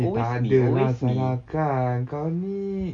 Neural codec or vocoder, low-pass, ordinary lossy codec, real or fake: none; 9.9 kHz; none; real